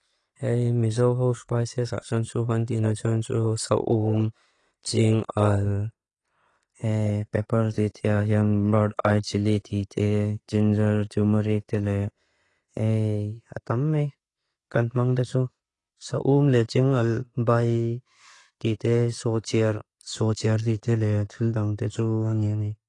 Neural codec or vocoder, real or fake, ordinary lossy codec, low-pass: none; real; AAC, 32 kbps; 10.8 kHz